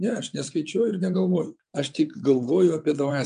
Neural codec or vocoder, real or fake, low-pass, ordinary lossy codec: vocoder, 22.05 kHz, 80 mel bands, WaveNeXt; fake; 9.9 kHz; MP3, 64 kbps